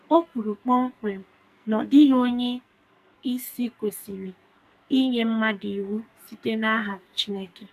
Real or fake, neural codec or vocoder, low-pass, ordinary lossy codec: fake; codec, 44.1 kHz, 2.6 kbps, SNAC; 14.4 kHz; MP3, 96 kbps